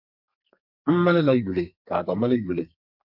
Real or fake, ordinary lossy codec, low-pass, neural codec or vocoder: fake; MP3, 48 kbps; 5.4 kHz; codec, 32 kHz, 1.9 kbps, SNAC